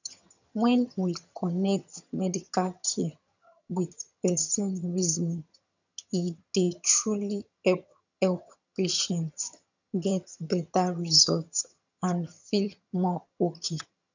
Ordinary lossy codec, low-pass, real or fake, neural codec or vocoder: none; 7.2 kHz; fake; vocoder, 22.05 kHz, 80 mel bands, HiFi-GAN